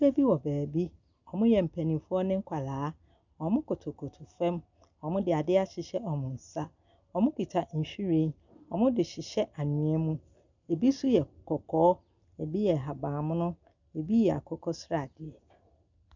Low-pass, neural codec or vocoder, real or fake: 7.2 kHz; none; real